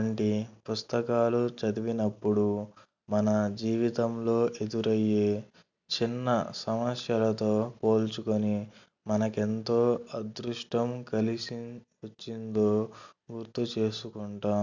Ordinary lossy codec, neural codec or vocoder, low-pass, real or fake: none; none; 7.2 kHz; real